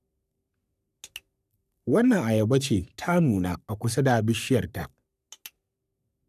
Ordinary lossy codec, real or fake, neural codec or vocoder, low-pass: none; fake; codec, 44.1 kHz, 3.4 kbps, Pupu-Codec; 14.4 kHz